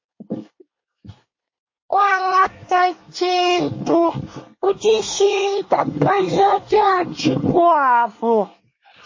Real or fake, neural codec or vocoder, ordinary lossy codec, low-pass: fake; codec, 24 kHz, 1 kbps, SNAC; MP3, 32 kbps; 7.2 kHz